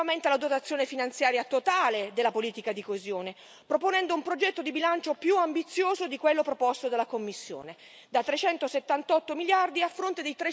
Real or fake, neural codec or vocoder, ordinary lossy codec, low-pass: real; none; none; none